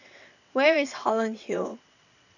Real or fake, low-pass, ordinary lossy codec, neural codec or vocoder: fake; 7.2 kHz; none; vocoder, 22.05 kHz, 80 mel bands, WaveNeXt